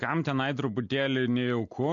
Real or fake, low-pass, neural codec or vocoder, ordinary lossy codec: real; 7.2 kHz; none; MP3, 48 kbps